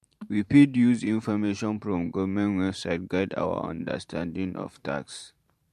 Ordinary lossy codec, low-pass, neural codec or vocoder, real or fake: MP3, 64 kbps; 14.4 kHz; vocoder, 44.1 kHz, 128 mel bands every 512 samples, BigVGAN v2; fake